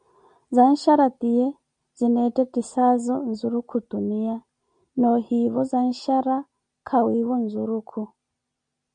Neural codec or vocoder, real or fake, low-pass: none; real; 9.9 kHz